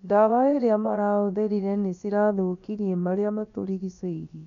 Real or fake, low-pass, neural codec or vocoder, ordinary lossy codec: fake; 7.2 kHz; codec, 16 kHz, about 1 kbps, DyCAST, with the encoder's durations; none